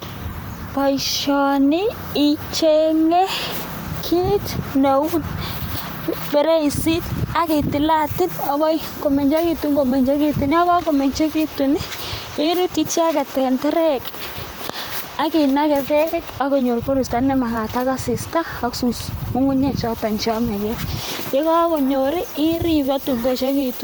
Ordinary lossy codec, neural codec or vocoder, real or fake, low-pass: none; vocoder, 44.1 kHz, 128 mel bands, Pupu-Vocoder; fake; none